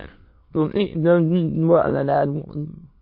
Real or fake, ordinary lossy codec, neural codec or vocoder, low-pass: fake; MP3, 32 kbps; autoencoder, 22.05 kHz, a latent of 192 numbers a frame, VITS, trained on many speakers; 5.4 kHz